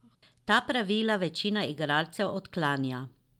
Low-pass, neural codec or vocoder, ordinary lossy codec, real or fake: 19.8 kHz; none; Opus, 32 kbps; real